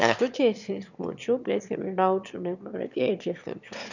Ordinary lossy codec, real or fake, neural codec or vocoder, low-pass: none; fake; autoencoder, 22.05 kHz, a latent of 192 numbers a frame, VITS, trained on one speaker; 7.2 kHz